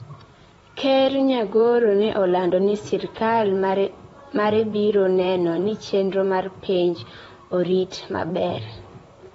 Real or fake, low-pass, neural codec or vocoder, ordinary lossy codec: fake; 19.8 kHz; vocoder, 44.1 kHz, 128 mel bands, Pupu-Vocoder; AAC, 24 kbps